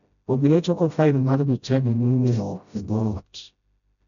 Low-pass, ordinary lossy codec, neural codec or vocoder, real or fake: 7.2 kHz; none; codec, 16 kHz, 0.5 kbps, FreqCodec, smaller model; fake